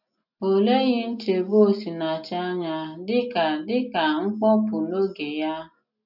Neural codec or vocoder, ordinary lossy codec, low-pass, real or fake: none; none; 5.4 kHz; real